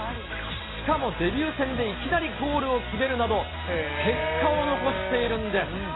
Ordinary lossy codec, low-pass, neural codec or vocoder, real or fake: AAC, 16 kbps; 7.2 kHz; none; real